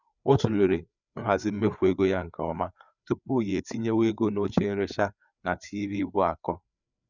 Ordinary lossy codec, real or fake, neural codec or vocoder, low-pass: none; fake; codec, 16 kHz, 4 kbps, FreqCodec, larger model; 7.2 kHz